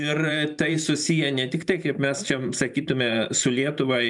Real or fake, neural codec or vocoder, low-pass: fake; vocoder, 44.1 kHz, 128 mel bands every 512 samples, BigVGAN v2; 10.8 kHz